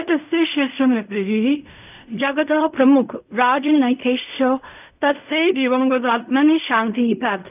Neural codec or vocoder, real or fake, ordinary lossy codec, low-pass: codec, 16 kHz in and 24 kHz out, 0.4 kbps, LongCat-Audio-Codec, fine tuned four codebook decoder; fake; none; 3.6 kHz